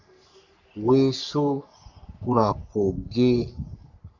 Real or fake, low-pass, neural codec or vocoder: fake; 7.2 kHz; codec, 44.1 kHz, 3.4 kbps, Pupu-Codec